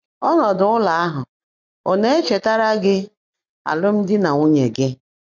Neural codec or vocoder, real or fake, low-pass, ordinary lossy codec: none; real; 7.2 kHz; none